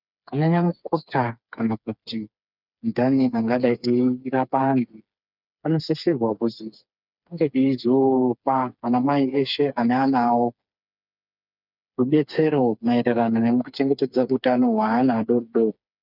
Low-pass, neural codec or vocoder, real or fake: 5.4 kHz; codec, 16 kHz, 4 kbps, FreqCodec, smaller model; fake